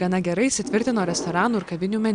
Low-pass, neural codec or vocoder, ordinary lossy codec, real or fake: 9.9 kHz; none; Opus, 64 kbps; real